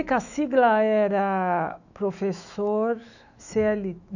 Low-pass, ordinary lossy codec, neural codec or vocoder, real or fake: 7.2 kHz; none; autoencoder, 48 kHz, 128 numbers a frame, DAC-VAE, trained on Japanese speech; fake